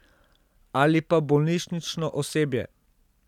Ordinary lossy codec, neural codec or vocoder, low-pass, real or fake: none; none; 19.8 kHz; real